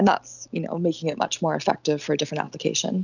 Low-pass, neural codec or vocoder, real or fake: 7.2 kHz; none; real